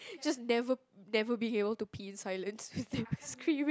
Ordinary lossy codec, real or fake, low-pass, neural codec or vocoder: none; real; none; none